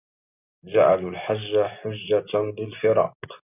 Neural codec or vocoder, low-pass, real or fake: none; 3.6 kHz; real